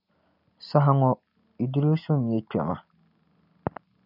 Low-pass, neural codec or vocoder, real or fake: 5.4 kHz; none; real